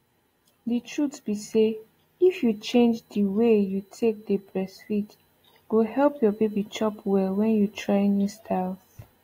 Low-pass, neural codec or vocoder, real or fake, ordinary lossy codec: 19.8 kHz; none; real; AAC, 48 kbps